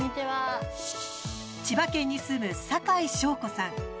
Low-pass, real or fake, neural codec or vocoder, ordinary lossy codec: none; real; none; none